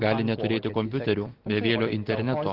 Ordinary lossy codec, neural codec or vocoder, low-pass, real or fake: Opus, 16 kbps; none; 5.4 kHz; real